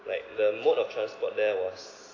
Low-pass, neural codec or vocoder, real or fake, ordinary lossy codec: 7.2 kHz; none; real; AAC, 32 kbps